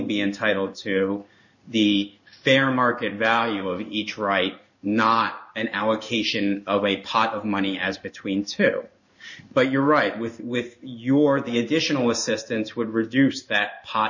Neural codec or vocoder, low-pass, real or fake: codec, 16 kHz in and 24 kHz out, 1 kbps, XY-Tokenizer; 7.2 kHz; fake